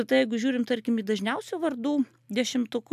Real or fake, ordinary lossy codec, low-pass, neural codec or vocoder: real; AAC, 96 kbps; 14.4 kHz; none